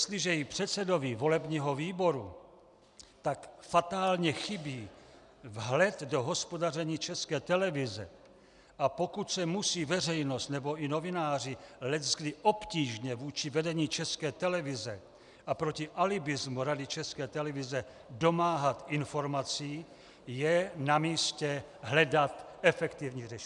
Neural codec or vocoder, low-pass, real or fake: none; 10.8 kHz; real